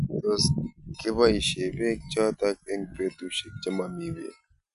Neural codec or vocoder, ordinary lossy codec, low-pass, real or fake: none; none; 10.8 kHz; real